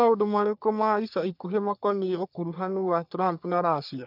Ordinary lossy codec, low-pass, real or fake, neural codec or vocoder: none; 5.4 kHz; fake; codec, 44.1 kHz, 3.4 kbps, Pupu-Codec